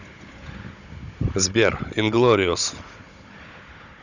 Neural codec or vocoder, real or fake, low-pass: codec, 16 kHz, 16 kbps, FunCodec, trained on Chinese and English, 50 frames a second; fake; 7.2 kHz